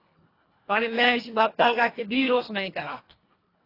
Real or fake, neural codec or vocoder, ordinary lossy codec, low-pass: fake; codec, 24 kHz, 1.5 kbps, HILCodec; AAC, 24 kbps; 5.4 kHz